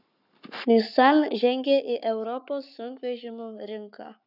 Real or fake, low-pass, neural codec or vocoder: fake; 5.4 kHz; codec, 44.1 kHz, 7.8 kbps, Pupu-Codec